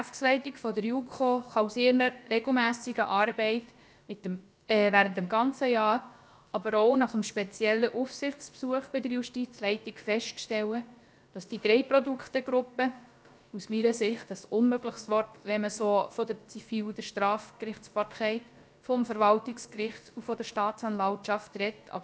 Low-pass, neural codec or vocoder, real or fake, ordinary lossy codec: none; codec, 16 kHz, about 1 kbps, DyCAST, with the encoder's durations; fake; none